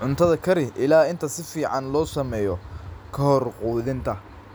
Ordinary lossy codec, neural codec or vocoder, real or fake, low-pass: none; none; real; none